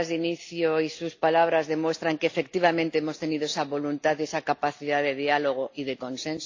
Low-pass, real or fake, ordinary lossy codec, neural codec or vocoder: 7.2 kHz; real; AAC, 48 kbps; none